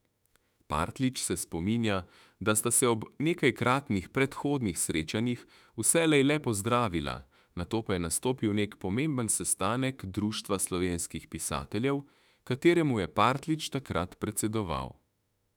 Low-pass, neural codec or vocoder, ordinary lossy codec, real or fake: 19.8 kHz; autoencoder, 48 kHz, 32 numbers a frame, DAC-VAE, trained on Japanese speech; none; fake